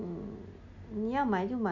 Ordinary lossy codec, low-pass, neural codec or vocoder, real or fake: none; 7.2 kHz; none; real